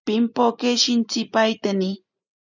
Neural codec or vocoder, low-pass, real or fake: none; 7.2 kHz; real